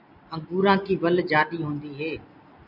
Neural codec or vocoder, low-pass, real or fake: none; 5.4 kHz; real